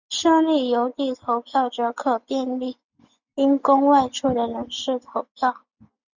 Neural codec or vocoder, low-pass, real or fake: none; 7.2 kHz; real